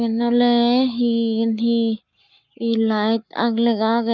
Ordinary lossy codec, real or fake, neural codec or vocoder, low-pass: none; fake; codec, 44.1 kHz, 7.8 kbps, Pupu-Codec; 7.2 kHz